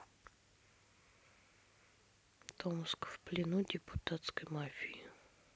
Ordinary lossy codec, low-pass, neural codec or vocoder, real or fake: none; none; none; real